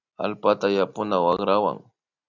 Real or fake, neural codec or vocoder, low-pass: real; none; 7.2 kHz